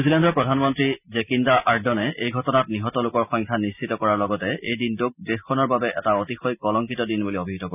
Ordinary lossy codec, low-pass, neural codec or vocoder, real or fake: none; 3.6 kHz; none; real